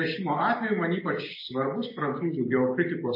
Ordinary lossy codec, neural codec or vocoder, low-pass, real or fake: MP3, 24 kbps; none; 5.4 kHz; real